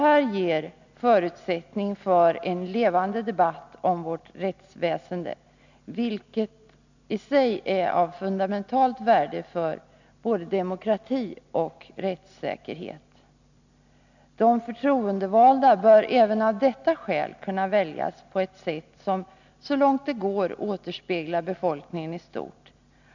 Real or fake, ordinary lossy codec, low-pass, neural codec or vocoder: real; none; 7.2 kHz; none